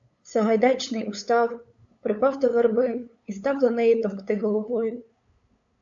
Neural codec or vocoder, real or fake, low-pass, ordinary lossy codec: codec, 16 kHz, 8 kbps, FunCodec, trained on LibriTTS, 25 frames a second; fake; 7.2 kHz; AAC, 64 kbps